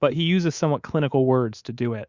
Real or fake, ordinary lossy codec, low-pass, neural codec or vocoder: fake; Opus, 64 kbps; 7.2 kHz; codec, 24 kHz, 3.1 kbps, DualCodec